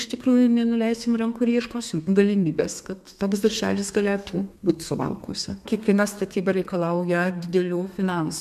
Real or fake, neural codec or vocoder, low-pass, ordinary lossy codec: fake; codec, 32 kHz, 1.9 kbps, SNAC; 14.4 kHz; MP3, 96 kbps